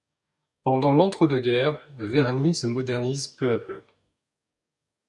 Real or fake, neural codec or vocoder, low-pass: fake; codec, 44.1 kHz, 2.6 kbps, DAC; 10.8 kHz